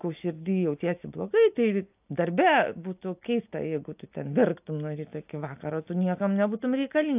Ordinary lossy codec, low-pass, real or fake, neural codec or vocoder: AAC, 32 kbps; 3.6 kHz; real; none